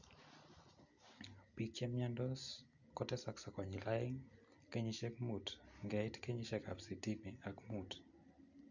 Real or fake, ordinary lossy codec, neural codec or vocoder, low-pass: real; none; none; 7.2 kHz